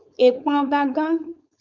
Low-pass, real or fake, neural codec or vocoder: 7.2 kHz; fake; codec, 16 kHz, 4.8 kbps, FACodec